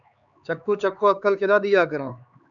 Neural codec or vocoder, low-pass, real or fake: codec, 16 kHz, 4 kbps, X-Codec, HuBERT features, trained on LibriSpeech; 7.2 kHz; fake